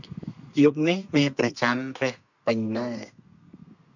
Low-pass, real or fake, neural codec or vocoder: 7.2 kHz; fake; codec, 32 kHz, 1.9 kbps, SNAC